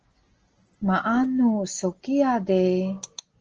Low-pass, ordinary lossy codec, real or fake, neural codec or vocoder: 7.2 kHz; Opus, 16 kbps; real; none